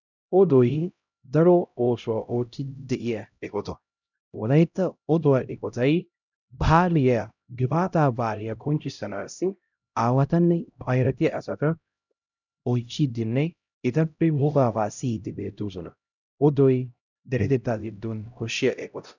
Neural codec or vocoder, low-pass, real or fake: codec, 16 kHz, 0.5 kbps, X-Codec, HuBERT features, trained on LibriSpeech; 7.2 kHz; fake